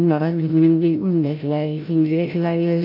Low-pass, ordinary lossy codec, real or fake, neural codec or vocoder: 5.4 kHz; none; fake; codec, 16 kHz, 0.5 kbps, FreqCodec, larger model